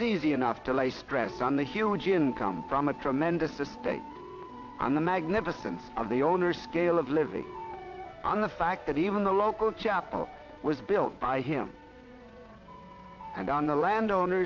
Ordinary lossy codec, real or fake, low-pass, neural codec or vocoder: AAC, 48 kbps; fake; 7.2 kHz; vocoder, 22.05 kHz, 80 mel bands, WaveNeXt